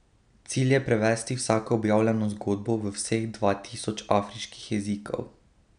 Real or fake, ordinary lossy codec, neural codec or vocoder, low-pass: real; none; none; 9.9 kHz